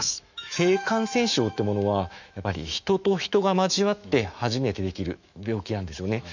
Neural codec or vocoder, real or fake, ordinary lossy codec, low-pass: autoencoder, 48 kHz, 128 numbers a frame, DAC-VAE, trained on Japanese speech; fake; none; 7.2 kHz